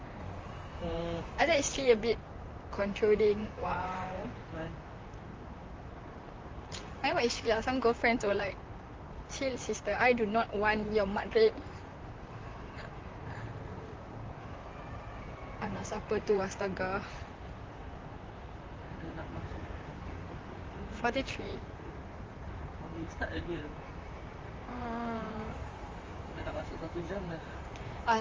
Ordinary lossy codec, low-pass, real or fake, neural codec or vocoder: Opus, 32 kbps; 7.2 kHz; fake; vocoder, 44.1 kHz, 128 mel bands, Pupu-Vocoder